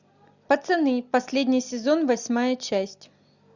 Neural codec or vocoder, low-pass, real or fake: none; 7.2 kHz; real